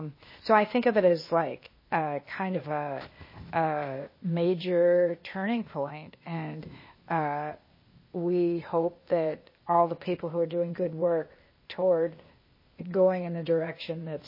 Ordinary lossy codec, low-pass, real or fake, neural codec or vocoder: MP3, 24 kbps; 5.4 kHz; fake; codec, 16 kHz, 0.8 kbps, ZipCodec